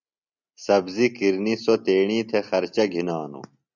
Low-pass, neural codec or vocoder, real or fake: 7.2 kHz; none; real